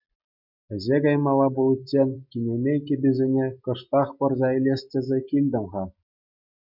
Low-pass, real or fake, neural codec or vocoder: 5.4 kHz; real; none